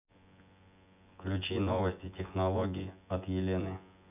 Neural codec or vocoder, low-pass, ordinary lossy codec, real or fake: vocoder, 24 kHz, 100 mel bands, Vocos; 3.6 kHz; none; fake